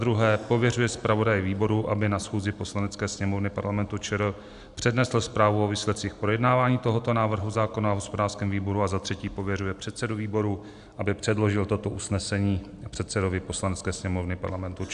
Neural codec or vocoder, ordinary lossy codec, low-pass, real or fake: none; AAC, 96 kbps; 10.8 kHz; real